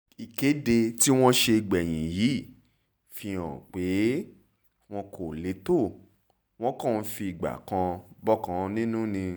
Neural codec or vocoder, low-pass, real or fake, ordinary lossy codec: none; none; real; none